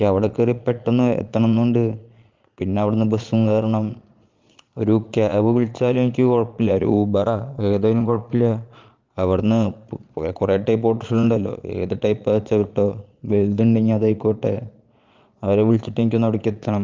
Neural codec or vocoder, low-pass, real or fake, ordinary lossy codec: vocoder, 22.05 kHz, 80 mel bands, Vocos; 7.2 kHz; fake; Opus, 32 kbps